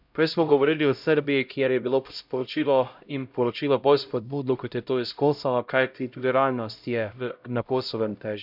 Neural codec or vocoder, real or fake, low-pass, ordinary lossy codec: codec, 16 kHz, 0.5 kbps, X-Codec, HuBERT features, trained on LibriSpeech; fake; 5.4 kHz; none